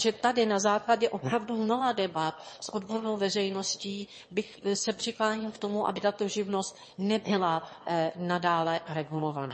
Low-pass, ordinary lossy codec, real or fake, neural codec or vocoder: 9.9 kHz; MP3, 32 kbps; fake; autoencoder, 22.05 kHz, a latent of 192 numbers a frame, VITS, trained on one speaker